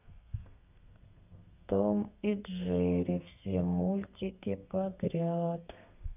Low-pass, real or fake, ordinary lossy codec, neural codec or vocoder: 3.6 kHz; fake; none; codec, 32 kHz, 1.9 kbps, SNAC